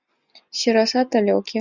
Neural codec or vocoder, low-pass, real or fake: none; 7.2 kHz; real